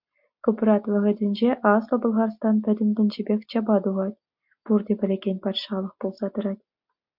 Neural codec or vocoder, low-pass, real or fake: none; 5.4 kHz; real